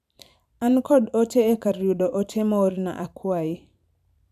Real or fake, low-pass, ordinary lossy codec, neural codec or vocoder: fake; 14.4 kHz; none; vocoder, 44.1 kHz, 128 mel bands every 512 samples, BigVGAN v2